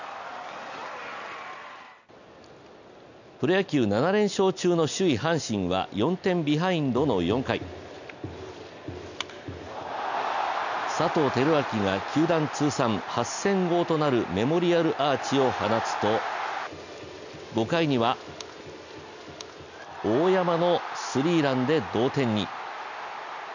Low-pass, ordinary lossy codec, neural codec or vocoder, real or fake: 7.2 kHz; none; none; real